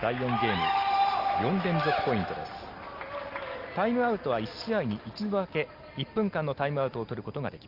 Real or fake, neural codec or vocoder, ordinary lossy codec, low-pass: real; none; Opus, 32 kbps; 5.4 kHz